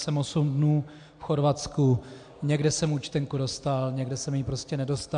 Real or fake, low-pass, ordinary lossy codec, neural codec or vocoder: real; 9.9 kHz; AAC, 64 kbps; none